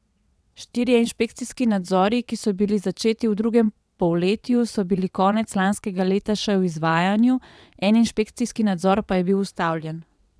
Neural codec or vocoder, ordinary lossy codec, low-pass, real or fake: vocoder, 22.05 kHz, 80 mel bands, WaveNeXt; none; none; fake